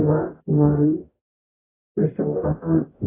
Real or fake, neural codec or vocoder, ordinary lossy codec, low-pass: fake; codec, 44.1 kHz, 0.9 kbps, DAC; AAC, 16 kbps; 3.6 kHz